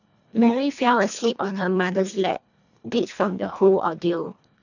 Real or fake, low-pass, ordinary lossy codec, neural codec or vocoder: fake; 7.2 kHz; none; codec, 24 kHz, 1.5 kbps, HILCodec